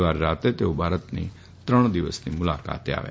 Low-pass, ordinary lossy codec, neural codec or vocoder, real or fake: none; none; none; real